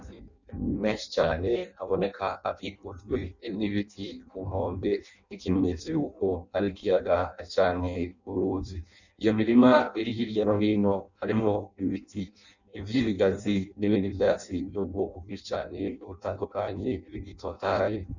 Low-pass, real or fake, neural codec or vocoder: 7.2 kHz; fake; codec, 16 kHz in and 24 kHz out, 0.6 kbps, FireRedTTS-2 codec